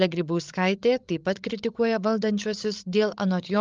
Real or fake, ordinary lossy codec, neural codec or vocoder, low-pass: fake; Opus, 32 kbps; codec, 16 kHz, 16 kbps, FreqCodec, larger model; 7.2 kHz